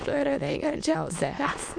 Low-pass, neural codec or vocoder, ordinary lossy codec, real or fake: 9.9 kHz; autoencoder, 22.05 kHz, a latent of 192 numbers a frame, VITS, trained on many speakers; MP3, 64 kbps; fake